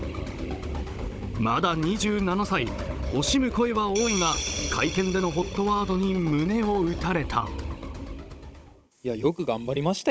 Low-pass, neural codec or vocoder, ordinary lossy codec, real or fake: none; codec, 16 kHz, 16 kbps, FunCodec, trained on Chinese and English, 50 frames a second; none; fake